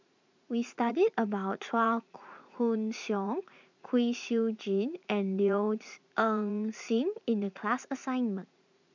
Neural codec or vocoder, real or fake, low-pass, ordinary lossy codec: vocoder, 44.1 kHz, 80 mel bands, Vocos; fake; 7.2 kHz; MP3, 64 kbps